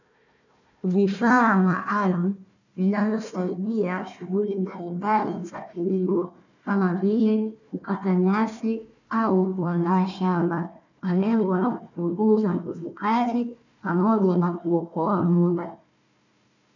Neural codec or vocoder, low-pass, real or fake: codec, 16 kHz, 1 kbps, FunCodec, trained on Chinese and English, 50 frames a second; 7.2 kHz; fake